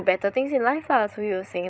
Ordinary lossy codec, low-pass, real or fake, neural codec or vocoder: none; none; fake; codec, 16 kHz, 16 kbps, FreqCodec, larger model